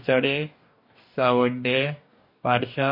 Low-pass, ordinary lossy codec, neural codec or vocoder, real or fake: 5.4 kHz; MP3, 32 kbps; codec, 44.1 kHz, 2.6 kbps, DAC; fake